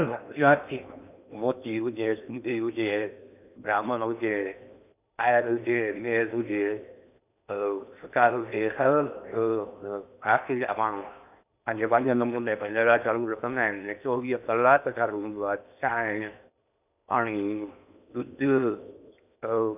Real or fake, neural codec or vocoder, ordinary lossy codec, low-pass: fake; codec, 16 kHz in and 24 kHz out, 0.8 kbps, FocalCodec, streaming, 65536 codes; none; 3.6 kHz